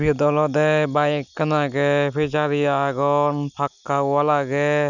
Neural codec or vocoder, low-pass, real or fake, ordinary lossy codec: none; 7.2 kHz; real; none